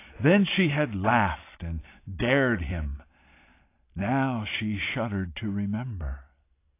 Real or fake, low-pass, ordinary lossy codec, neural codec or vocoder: real; 3.6 kHz; AAC, 24 kbps; none